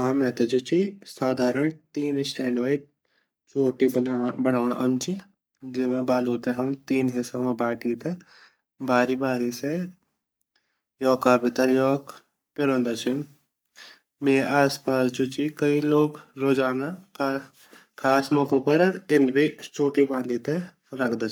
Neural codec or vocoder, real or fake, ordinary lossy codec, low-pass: codec, 44.1 kHz, 3.4 kbps, Pupu-Codec; fake; none; none